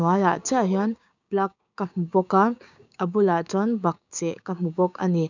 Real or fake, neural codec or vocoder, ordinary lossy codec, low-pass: fake; codec, 16 kHz, 6 kbps, DAC; none; 7.2 kHz